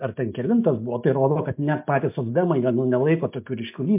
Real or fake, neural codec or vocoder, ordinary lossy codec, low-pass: real; none; MP3, 32 kbps; 3.6 kHz